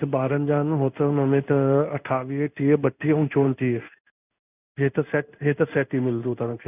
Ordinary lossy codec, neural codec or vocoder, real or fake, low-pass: none; codec, 16 kHz in and 24 kHz out, 1 kbps, XY-Tokenizer; fake; 3.6 kHz